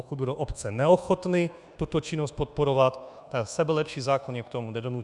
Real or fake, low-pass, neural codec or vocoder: fake; 10.8 kHz; codec, 24 kHz, 1.2 kbps, DualCodec